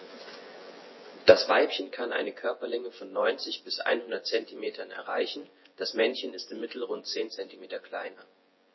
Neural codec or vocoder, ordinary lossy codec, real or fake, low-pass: vocoder, 24 kHz, 100 mel bands, Vocos; MP3, 24 kbps; fake; 7.2 kHz